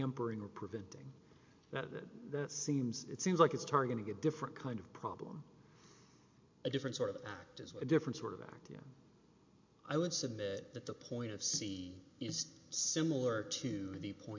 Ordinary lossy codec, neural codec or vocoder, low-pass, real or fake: MP3, 48 kbps; none; 7.2 kHz; real